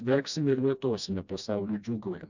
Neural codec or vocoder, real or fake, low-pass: codec, 16 kHz, 1 kbps, FreqCodec, smaller model; fake; 7.2 kHz